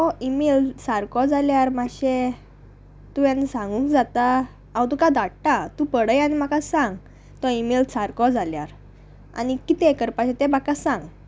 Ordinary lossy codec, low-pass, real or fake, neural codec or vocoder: none; none; real; none